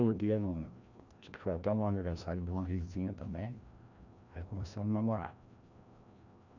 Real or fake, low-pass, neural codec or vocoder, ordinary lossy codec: fake; 7.2 kHz; codec, 16 kHz, 1 kbps, FreqCodec, larger model; none